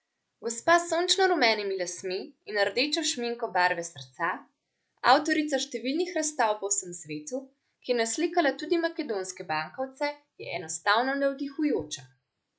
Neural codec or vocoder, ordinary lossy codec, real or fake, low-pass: none; none; real; none